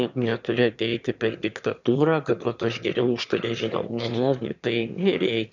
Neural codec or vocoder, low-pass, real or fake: autoencoder, 22.05 kHz, a latent of 192 numbers a frame, VITS, trained on one speaker; 7.2 kHz; fake